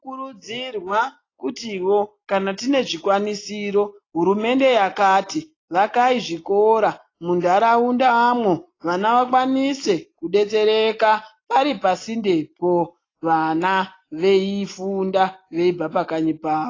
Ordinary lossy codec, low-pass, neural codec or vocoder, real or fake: AAC, 32 kbps; 7.2 kHz; none; real